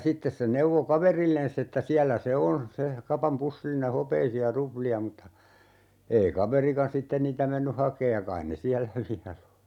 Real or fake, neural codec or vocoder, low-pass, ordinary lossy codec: real; none; 19.8 kHz; none